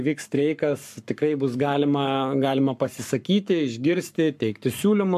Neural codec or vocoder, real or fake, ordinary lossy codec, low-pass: codec, 44.1 kHz, 7.8 kbps, Pupu-Codec; fake; MP3, 96 kbps; 14.4 kHz